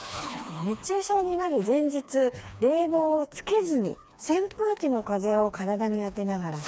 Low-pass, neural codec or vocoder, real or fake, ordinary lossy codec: none; codec, 16 kHz, 2 kbps, FreqCodec, smaller model; fake; none